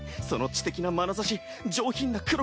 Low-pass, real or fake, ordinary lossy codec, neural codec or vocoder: none; real; none; none